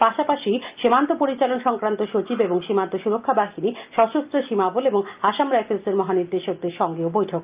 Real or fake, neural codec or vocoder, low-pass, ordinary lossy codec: real; none; 3.6 kHz; Opus, 24 kbps